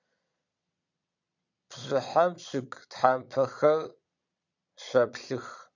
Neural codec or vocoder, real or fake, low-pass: vocoder, 22.05 kHz, 80 mel bands, Vocos; fake; 7.2 kHz